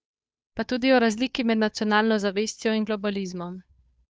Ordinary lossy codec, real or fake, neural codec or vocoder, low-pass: none; fake; codec, 16 kHz, 2 kbps, FunCodec, trained on Chinese and English, 25 frames a second; none